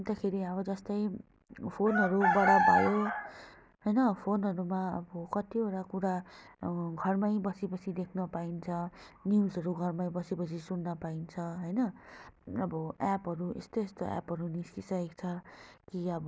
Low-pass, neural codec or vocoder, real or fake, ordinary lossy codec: none; none; real; none